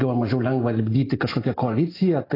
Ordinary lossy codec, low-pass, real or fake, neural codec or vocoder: AAC, 24 kbps; 5.4 kHz; real; none